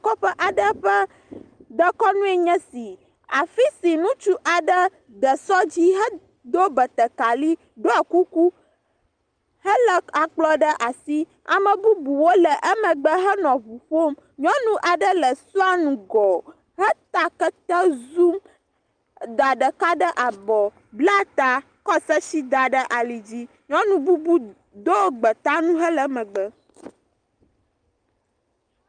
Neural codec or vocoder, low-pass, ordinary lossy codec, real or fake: none; 9.9 kHz; Opus, 32 kbps; real